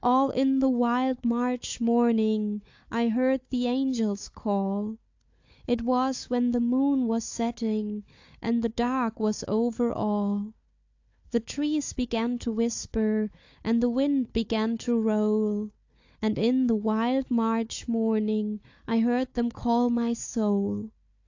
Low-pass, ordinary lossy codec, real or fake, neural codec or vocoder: 7.2 kHz; AAC, 48 kbps; fake; codec, 16 kHz, 4 kbps, FunCodec, trained on Chinese and English, 50 frames a second